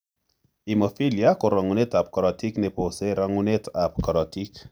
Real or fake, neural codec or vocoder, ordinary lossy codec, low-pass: real; none; none; none